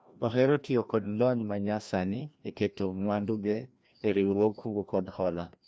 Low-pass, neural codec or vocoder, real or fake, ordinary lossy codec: none; codec, 16 kHz, 1 kbps, FreqCodec, larger model; fake; none